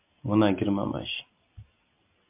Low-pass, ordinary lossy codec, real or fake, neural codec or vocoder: 3.6 kHz; MP3, 32 kbps; real; none